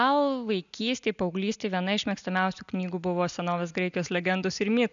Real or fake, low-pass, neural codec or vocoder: real; 7.2 kHz; none